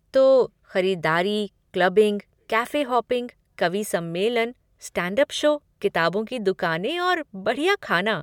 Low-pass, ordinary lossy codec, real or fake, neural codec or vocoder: 19.8 kHz; MP3, 96 kbps; real; none